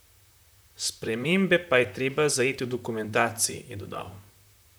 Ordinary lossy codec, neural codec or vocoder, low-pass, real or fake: none; vocoder, 44.1 kHz, 128 mel bands, Pupu-Vocoder; none; fake